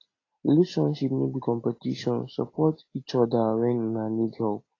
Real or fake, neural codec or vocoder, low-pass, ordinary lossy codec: real; none; 7.2 kHz; AAC, 32 kbps